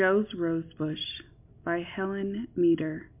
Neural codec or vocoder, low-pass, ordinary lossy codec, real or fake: none; 3.6 kHz; MP3, 32 kbps; real